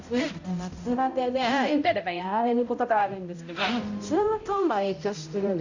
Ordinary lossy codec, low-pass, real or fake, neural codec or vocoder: Opus, 64 kbps; 7.2 kHz; fake; codec, 16 kHz, 0.5 kbps, X-Codec, HuBERT features, trained on balanced general audio